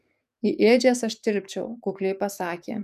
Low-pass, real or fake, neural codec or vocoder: 14.4 kHz; fake; codec, 44.1 kHz, 7.8 kbps, DAC